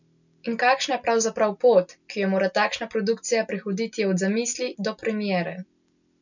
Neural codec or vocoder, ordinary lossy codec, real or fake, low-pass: none; none; real; 7.2 kHz